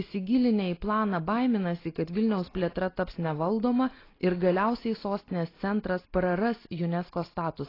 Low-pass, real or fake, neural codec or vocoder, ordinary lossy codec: 5.4 kHz; real; none; AAC, 24 kbps